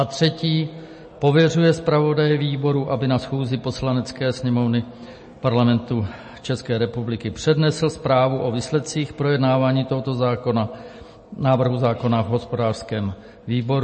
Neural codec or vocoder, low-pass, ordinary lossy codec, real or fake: none; 10.8 kHz; MP3, 32 kbps; real